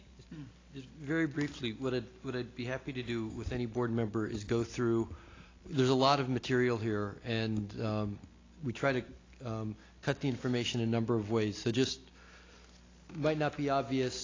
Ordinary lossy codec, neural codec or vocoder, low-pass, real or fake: AAC, 32 kbps; none; 7.2 kHz; real